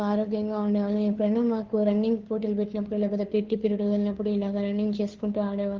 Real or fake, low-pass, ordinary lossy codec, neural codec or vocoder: fake; 7.2 kHz; Opus, 32 kbps; codec, 16 kHz, 2 kbps, FunCodec, trained on Chinese and English, 25 frames a second